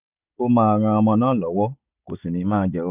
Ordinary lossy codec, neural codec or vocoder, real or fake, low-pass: none; none; real; 3.6 kHz